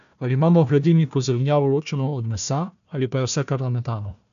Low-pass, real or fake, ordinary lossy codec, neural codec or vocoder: 7.2 kHz; fake; none; codec, 16 kHz, 1 kbps, FunCodec, trained on Chinese and English, 50 frames a second